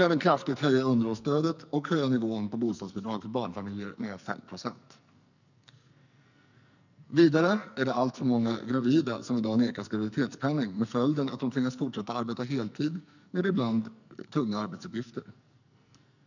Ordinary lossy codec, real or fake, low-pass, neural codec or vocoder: none; fake; 7.2 kHz; codec, 44.1 kHz, 2.6 kbps, SNAC